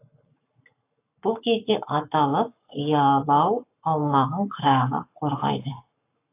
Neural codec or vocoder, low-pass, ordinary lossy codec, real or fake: codec, 44.1 kHz, 7.8 kbps, Pupu-Codec; 3.6 kHz; AAC, 24 kbps; fake